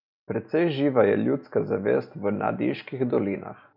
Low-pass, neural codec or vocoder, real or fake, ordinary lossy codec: 5.4 kHz; none; real; none